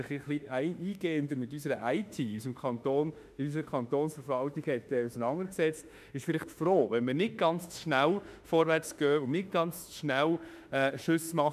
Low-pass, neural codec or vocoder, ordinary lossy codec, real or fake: 14.4 kHz; autoencoder, 48 kHz, 32 numbers a frame, DAC-VAE, trained on Japanese speech; none; fake